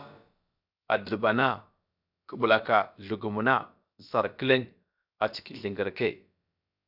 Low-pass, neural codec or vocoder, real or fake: 5.4 kHz; codec, 16 kHz, about 1 kbps, DyCAST, with the encoder's durations; fake